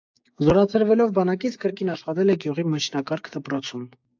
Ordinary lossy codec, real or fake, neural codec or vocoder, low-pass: AAC, 48 kbps; fake; codec, 16 kHz, 6 kbps, DAC; 7.2 kHz